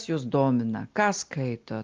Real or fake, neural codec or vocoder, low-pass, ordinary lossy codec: real; none; 7.2 kHz; Opus, 32 kbps